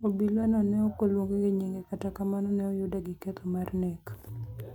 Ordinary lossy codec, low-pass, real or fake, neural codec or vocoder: none; 19.8 kHz; real; none